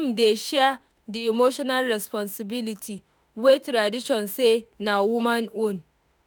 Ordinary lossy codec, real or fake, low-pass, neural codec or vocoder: none; fake; none; autoencoder, 48 kHz, 32 numbers a frame, DAC-VAE, trained on Japanese speech